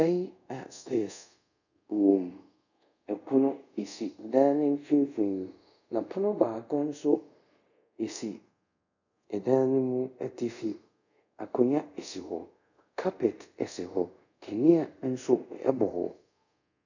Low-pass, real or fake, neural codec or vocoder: 7.2 kHz; fake; codec, 24 kHz, 0.5 kbps, DualCodec